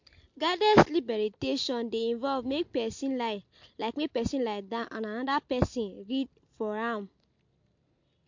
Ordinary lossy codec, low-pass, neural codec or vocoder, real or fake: MP3, 48 kbps; 7.2 kHz; none; real